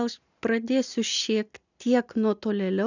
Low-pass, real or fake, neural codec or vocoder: 7.2 kHz; real; none